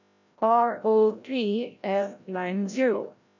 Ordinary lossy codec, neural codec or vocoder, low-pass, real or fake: none; codec, 16 kHz, 0.5 kbps, FreqCodec, larger model; 7.2 kHz; fake